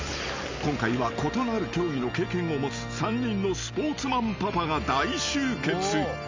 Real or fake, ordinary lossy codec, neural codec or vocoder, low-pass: real; MP3, 64 kbps; none; 7.2 kHz